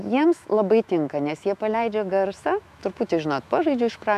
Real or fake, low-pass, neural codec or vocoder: fake; 14.4 kHz; autoencoder, 48 kHz, 128 numbers a frame, DAC-VAE, trained on Japanese speech